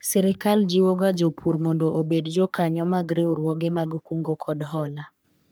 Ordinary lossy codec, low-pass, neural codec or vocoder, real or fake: none; none; codec, 44.1 kHz, 3.4 kbps, Pupu-Codec; fake